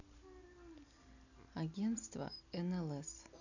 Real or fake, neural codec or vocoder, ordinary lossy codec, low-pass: real; none; none; 7.2 kHz